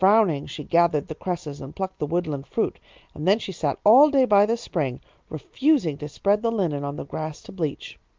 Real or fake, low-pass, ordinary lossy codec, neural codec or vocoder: real; 7.2 kHz; Opus, 24 kbps; none